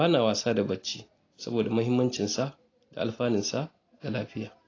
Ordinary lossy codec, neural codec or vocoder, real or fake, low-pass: AAC, 32 kbps; none; real; 7.2 kHz